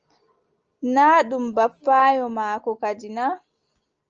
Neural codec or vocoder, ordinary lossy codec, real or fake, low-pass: none; Opus, 24 kbps; real; 7.2 kHz